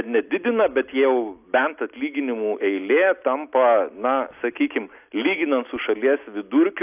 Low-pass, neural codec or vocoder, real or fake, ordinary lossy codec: 3.6 kHz; none; real; AAC, 32 kbps